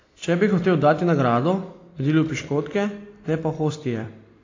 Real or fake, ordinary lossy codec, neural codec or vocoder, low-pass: real; AAC, 32 kbps; none; 7.2 kHz